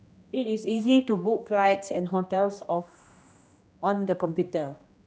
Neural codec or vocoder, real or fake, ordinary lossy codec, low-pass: codec, 16 kHz, 1 kbps, X-Codec, HuBERT features, trained on general audio; fake; none; none